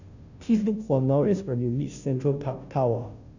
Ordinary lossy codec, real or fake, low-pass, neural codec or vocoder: none; fake; 7.2 kHz; codec, 16 kHz, 0.5 kbps, FunCodec, trained on Chinese and English, 25 frames a second